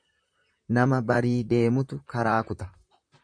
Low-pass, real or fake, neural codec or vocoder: 9.9 kHz; fake; vocoder, 44.1 kHz, 128 mel bands, Pupu-Vocoder